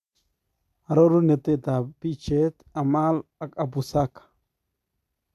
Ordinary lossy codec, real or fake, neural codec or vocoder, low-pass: AAC, 96 kbps; real; none; 14.4 kHz